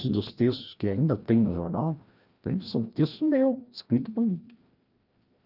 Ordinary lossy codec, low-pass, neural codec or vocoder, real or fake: Opus, 24 kbps; 5.4 kHz; codec, 16 kHz, 1 kbps, FreqCodec, larger model; fake